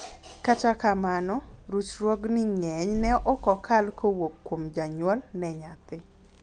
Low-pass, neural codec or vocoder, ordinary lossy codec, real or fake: 10.8 kHz; none; Opus, 32 kbps; real